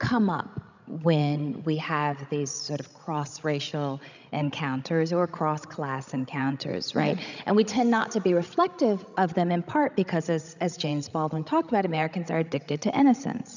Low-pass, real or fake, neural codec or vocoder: 7.2 kHz; fake; codec, 16 kHz, 16 kbps, FreqCodec, larger model